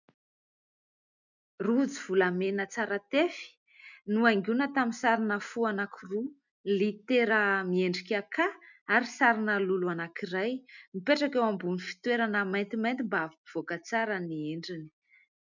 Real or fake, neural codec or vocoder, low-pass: real; none; 7.2 kHz